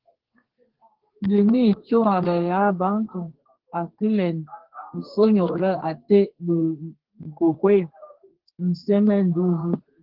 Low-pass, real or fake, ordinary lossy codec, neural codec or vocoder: 5.4 kHz; fake; Opus, 16 kbps; codec, 32 kHz, 1.9 kbps, SNAC